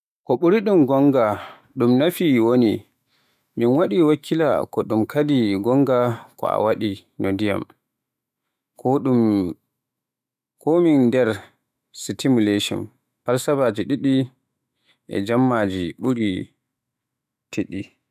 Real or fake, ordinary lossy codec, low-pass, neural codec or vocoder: fake; none; 14.4 kHz; autoencoder, 48 kHz, 128 numbers a frame, DAC-VAE, trained on Japanese speech